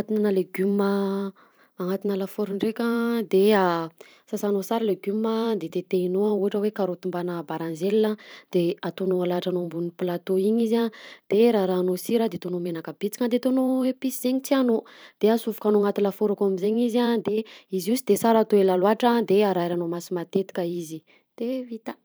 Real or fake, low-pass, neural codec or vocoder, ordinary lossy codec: fake; none; vocoder, 44.1 kHz, 128 mel bands every 512 samples, BigVGAN v2; none